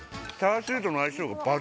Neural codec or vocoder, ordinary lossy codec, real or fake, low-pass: none; none; real; none